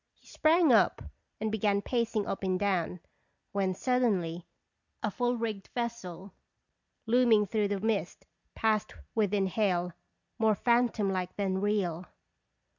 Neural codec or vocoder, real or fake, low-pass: none; real; 7.2 kHz